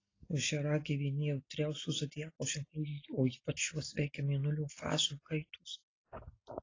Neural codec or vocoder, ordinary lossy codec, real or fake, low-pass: none; AAC, 32 kbps; real; 7.2 kHz